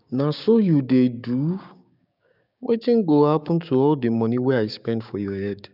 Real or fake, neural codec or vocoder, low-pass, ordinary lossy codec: fake; codec, 16 kHz, 6 kbps, DAC; 5.4 kHz; none